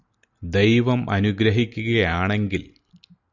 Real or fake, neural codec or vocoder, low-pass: real; none; 7.2 kHz